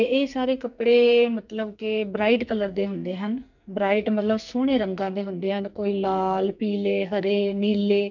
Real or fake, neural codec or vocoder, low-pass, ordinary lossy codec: fake; codec, 44.1 kHz, 2.6 kbps, SNAC; 7.2 kHz; none